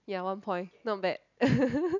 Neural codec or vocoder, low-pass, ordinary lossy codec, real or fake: none; 7.2 kHz; none; real